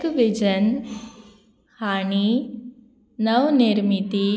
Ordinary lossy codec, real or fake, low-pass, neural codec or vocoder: none; real; none; none